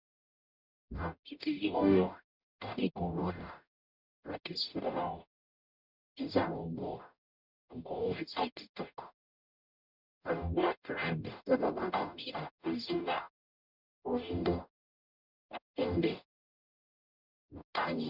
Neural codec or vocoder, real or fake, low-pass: codec, 44.1 kHz, 0.9 kbps, DAC; fake; 5.4 kHz